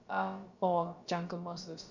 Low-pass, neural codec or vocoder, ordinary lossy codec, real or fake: 7.2 kHz; codec, 16 kHz, about 1 kbps, DyCAST, with the encoder's durations; Opus, 64 kbps; fake